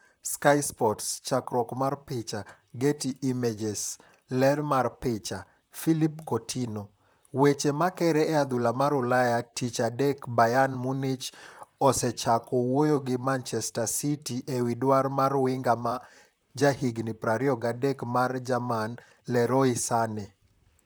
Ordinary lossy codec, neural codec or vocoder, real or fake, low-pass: none; vocoder, 44.1 kHz, 128 mel bands, Pupu-Vocoder; fake; none